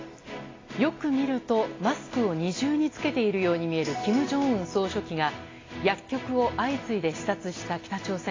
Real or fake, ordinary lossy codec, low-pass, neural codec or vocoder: real; AAC, 32 kbps; 7.2 kHz; none